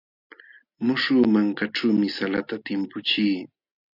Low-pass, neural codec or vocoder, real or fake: 5.4 kHz; none; real